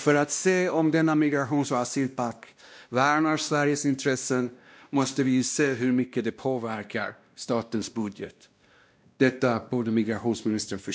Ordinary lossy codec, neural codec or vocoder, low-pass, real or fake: none; codec, 16 kHz, 1 kbps, X-Codec, WavLM features, trained on Multilingual LibriSpeech; none; fake